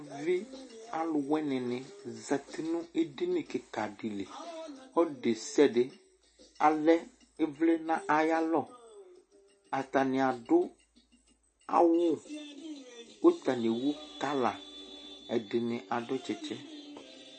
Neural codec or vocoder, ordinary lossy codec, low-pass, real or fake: none; MP3, 32 kbps; 9.9 kHz; real